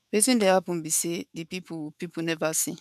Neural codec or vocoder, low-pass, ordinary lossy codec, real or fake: autoencoder, 48 kHz, 128 numbers a frame, DAC-VAE, trained on Japanese speech; 14.4 kHz; none; fake